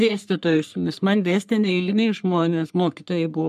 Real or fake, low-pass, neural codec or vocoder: fake; 14.4 kHz; codec, 44.1 kHz, 3.4 kbps, Pupu-Codec